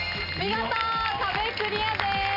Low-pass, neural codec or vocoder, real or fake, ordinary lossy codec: 5.4 kHz; none; real; AAC, 32 kbps